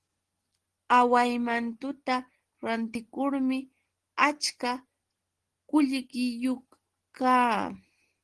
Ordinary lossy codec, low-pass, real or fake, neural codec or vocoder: Opus, 16 kbps; 10.8 kHz; real; none